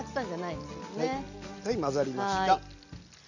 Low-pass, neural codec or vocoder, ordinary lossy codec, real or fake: 7.2 kHz; none; none; real